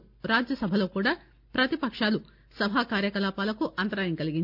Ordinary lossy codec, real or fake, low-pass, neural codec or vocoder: none; real; 5.4 kHz; none